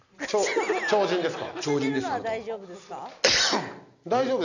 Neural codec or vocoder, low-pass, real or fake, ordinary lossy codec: none; 7.2 kHz; real; none